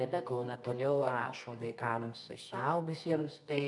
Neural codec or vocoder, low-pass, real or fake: codec, 24 kHz, 0.9 kbps, WavTokenizer, medium music audio release; 10.8 kHz; fake